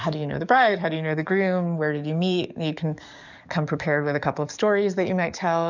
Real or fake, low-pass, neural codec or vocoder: fake; 7.2 kHz; codec, 44.1 kHz, 7.8 kbps, DAC